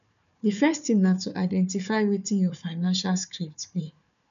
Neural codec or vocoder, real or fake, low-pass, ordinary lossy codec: codec, 16 kHz, 4 kbps, FunCodec, trained on Chinese and English, 50 frames a second; fake; 7.2 kHz; none